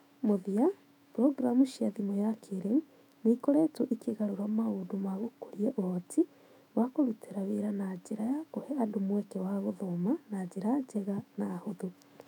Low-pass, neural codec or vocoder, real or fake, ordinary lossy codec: 19.8 kHz; autoencoder, 48 kHz, 128 numbers a frame, DAC-VAE, trained on Japanese speech; fake; none